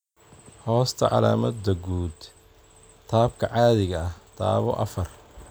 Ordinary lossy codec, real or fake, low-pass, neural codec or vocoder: none; real; none; none